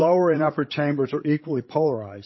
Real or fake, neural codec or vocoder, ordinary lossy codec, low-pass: fake; codec, 16 kHz, 16 kbps, FreqCodec, larger model; MP3, 24 kbps; 7.2 kHz